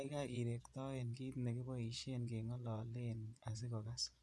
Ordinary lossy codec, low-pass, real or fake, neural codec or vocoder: none; none; fake; vocoder, 24 kHz, 100 mel bands, Vocos